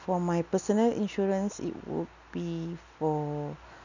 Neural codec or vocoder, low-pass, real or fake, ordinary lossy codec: none; 7.2 kHz; real; none